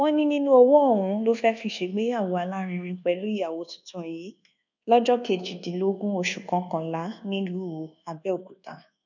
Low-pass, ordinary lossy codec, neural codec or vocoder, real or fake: 7.2 kHz; none; codec, 24 kHz, 1.2 kbps, DualCodec; fake